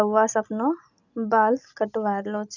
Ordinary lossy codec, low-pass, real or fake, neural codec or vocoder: none; 7.2 kHz; real; none